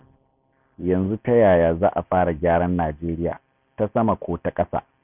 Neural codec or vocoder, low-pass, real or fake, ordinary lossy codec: none; 3.6 kHz; real; none